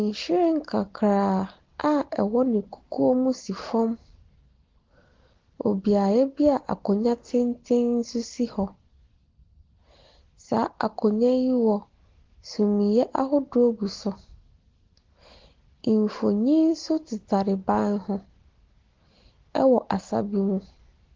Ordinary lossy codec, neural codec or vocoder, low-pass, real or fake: Opus, 16 kbps; none; 7.2 kHz; real